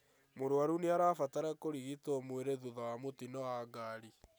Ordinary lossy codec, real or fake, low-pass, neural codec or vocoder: none; real; none; none